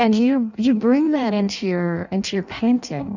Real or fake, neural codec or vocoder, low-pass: fake; codec, 16 kHz in and 24 kHz out, 0.6 kbps, FireRedTTS-2 codec; 7.2 kHz